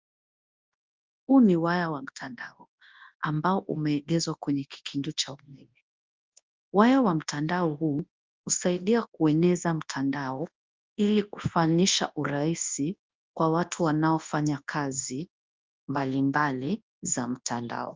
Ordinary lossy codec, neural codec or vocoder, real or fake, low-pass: Opus, 32 kbps; codec, 24 kHz, 0.9 kbps, WavTokenizer, large speech release; fake; 7.2 kHz